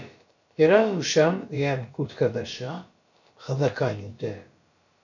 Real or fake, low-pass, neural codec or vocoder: fake; 7.2 kHz; codec, 16 kHz, about 1 kbps, DyCAST, with the encoder's durations